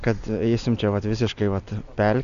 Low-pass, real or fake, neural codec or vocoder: 7.2 kHz; real; none